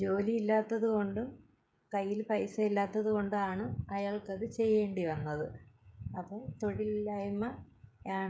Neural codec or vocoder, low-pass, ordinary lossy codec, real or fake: codec, 16 kHz, 16 kbps, FreqCodec, smaller model; none; none; fake